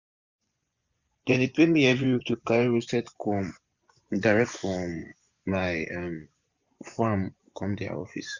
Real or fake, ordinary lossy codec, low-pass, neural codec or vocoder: fake; Opus, 64 kbps; 7.2 kHz; vocoder, 24 kHz, 100 mel bands, Vocos